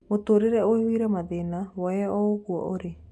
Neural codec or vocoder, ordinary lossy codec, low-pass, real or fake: none; none; none; real